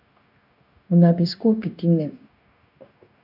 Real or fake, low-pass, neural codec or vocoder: fake; 5.4 kHz; codec, 16 kHz, 0.9 kbps, LongCat-Audio-Codec